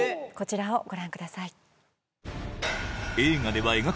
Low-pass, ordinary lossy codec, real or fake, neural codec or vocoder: none; none; real; none